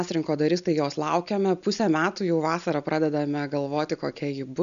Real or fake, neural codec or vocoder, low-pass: real; none; 7.2 kHz